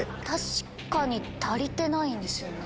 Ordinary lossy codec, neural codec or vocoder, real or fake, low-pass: none; none; real; none